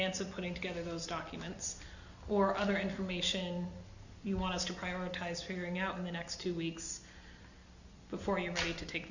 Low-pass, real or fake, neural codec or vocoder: 7.2 kHz; real; none